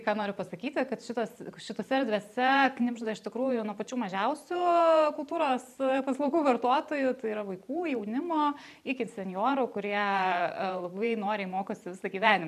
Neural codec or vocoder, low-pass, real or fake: vocoder, 44.1 kHz, 128 mel bands every 512 samples, BigVGAN v2; 14.4 kHz; fake